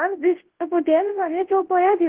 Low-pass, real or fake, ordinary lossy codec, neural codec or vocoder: 3.6 kHz; fake; Opus, 16 kbps; codec, 24 kHz, 0.9 kbps, WavTokenizer, large speech release